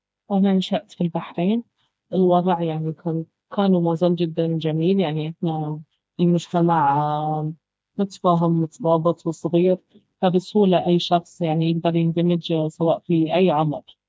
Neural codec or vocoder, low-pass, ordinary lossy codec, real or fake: codec, 16 kHz, 2 kbps, FreqCodec, smaller model; none; none; fake